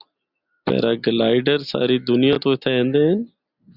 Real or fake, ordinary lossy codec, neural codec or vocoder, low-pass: real; Opus, 64 kbps; none; 5.4 kHz